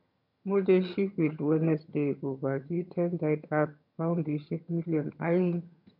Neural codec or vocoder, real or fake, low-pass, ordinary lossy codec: vocoder, 22.05 kHz, 80 mel bands, HiFi-GAN; fake; 5.4 kHz; none